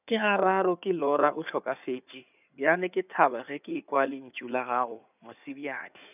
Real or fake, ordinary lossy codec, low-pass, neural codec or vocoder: fake; none; 3.6 kHz; codec, 16 kHz in and 24 kHz out, 2.2 kbps, FireRedTTS-2 codec